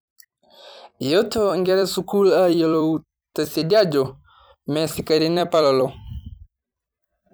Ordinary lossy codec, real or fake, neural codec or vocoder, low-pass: none; fake; vocoder, 44.1 kHz, 128 mel bands every 256 samples, BigVGAN v2; none